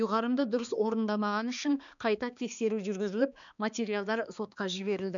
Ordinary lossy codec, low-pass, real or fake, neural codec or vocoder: none; 7.2 kHz; fake; codec, 16 kHz, 2 kbps, X-Codec, HuBERT features, trained on balanced general audio